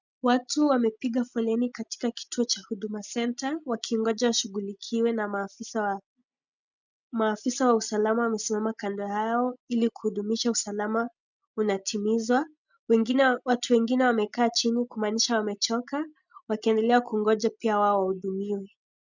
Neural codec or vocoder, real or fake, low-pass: none; real; 7.2 kHz